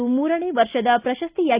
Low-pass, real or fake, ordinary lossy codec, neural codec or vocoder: 3.6 kHz; real; Opus, 64 kbps; none